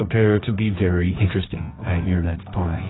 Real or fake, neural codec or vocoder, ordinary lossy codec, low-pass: fake; codec, 24 kHz, 0.9 kbps, WavTokenizer, medium music audio release; AAC, 16 kbps; 7.2 kHz